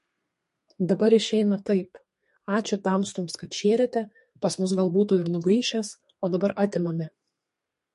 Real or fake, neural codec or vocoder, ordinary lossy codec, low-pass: fake; codec, 32 kHz, 1.9 kbps, SNAC; MP3, 48 kbps; 14.4 kHz